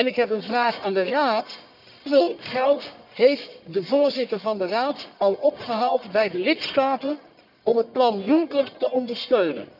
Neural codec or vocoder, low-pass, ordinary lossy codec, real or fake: codec, 44.1 kHz, 1.7 kbps, Pupu-Codec; 5.4 kHz; none; fake